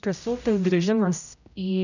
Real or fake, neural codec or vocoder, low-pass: fake; codec, 16 kHz, 0.5 kbps, X-Codec, HuBERT features, trained on general audio; 7.2 kHz